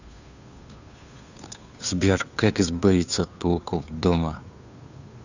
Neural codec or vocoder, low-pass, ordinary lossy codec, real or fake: codec, 16 kHz, 2 kbps, FunCodec, trained on Chinese and English, 25 frames a second; 7.2 kHz; none; fake